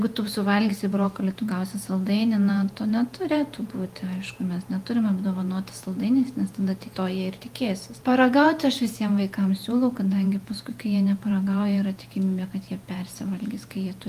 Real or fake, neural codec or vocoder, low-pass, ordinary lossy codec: fake; vocoder, 48 kHz, 128 mel bands, Vocos; 14.4 kHz; Opus, 32 kbps